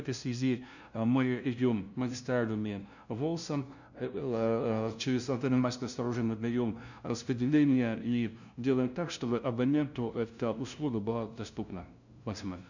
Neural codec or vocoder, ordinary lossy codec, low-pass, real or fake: codec, 16 kHz, 0.5 kbps, FunCodec, trained on LibriTTS, 25 frames a second; none; 7.2 kHz; fake